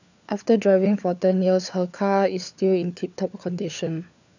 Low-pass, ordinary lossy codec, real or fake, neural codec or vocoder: 7.2 kHz; none; fake; codec, 16 kHz, 4 kbps, FunCodec, trained on LibriTTS, 50 frames a second